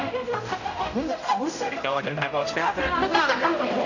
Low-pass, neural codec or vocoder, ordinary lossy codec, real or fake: 7.2 kHz; codec, 16 kHz, 0.5 kbps, X-Codec, HuBERT features, trained on general audio; none; fake